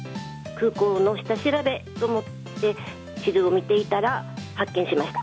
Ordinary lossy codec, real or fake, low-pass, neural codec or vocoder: none; real; none; none